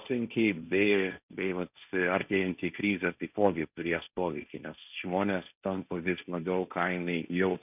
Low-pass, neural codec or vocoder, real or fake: 3.6 kHz; codec, 16 kHz, 1.1 kbps, Voila-Tokenizer; fake